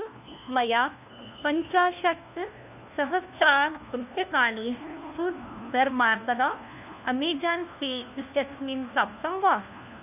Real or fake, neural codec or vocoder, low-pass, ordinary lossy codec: fake; codec, 16 kHz, 1 kbps, FunCodec, trained on LibriTTS, 50 frames a second; 3.6 kHz; none